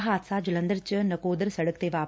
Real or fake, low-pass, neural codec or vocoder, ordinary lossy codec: real; none; none; none